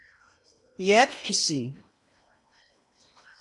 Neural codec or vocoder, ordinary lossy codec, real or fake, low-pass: codec, 16 kHz in and 24 kHz out, 0.8 kbps, FocalCodec, streaming, 65536 codes; AAC, 64 kbps; fake; 10.8 kHz